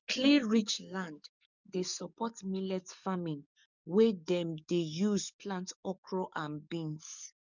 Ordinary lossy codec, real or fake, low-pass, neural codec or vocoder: none; fake; 7.2 kHz; codec, 44.1 kHz, 7.8 kbps, DAC